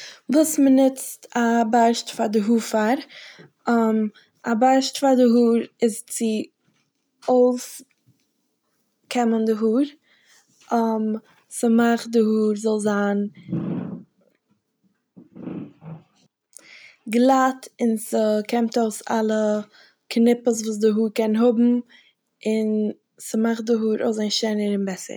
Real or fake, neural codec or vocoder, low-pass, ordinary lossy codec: real; none; none; none